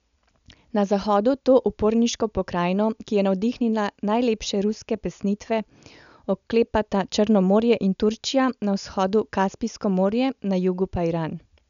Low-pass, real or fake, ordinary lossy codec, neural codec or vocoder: 7.2 kHz; real; none; none